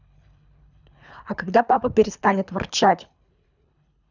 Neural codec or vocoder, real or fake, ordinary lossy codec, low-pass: codec, 24 kHz, 3 kbps, HILCodec; fake; none; 7.2 kHz